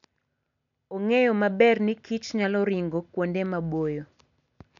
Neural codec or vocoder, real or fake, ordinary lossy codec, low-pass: none; real; none; 7.2 kHz